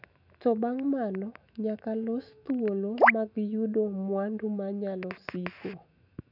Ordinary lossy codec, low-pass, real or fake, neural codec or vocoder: none; 5.4 kHz; fake; autoencoder, 48 kHz, 128 numbers a frame, DAC-VAE, trained on Japanese speech